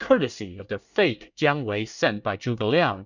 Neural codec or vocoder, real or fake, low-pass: codec, 24 kHz, 1 kbps, SNAC; fake; 7.2 kHz